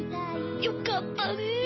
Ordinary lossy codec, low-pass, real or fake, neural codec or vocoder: MP3, 24 kbps; 7.2 kHz; real; none